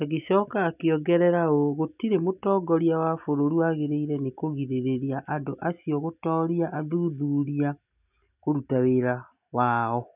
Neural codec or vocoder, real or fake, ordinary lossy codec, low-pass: none; real; none; 3.6 kHz